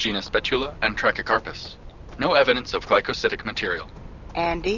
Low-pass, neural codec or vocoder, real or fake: 7.2 kHz; none; real